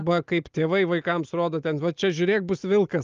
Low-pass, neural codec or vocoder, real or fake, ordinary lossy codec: 7.2 kHz; none; real; Opus, 24 kbps